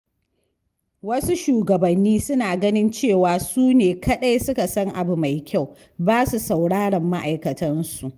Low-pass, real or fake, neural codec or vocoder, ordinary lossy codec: 14.4 kHz; real; none; Opus, 32 kbps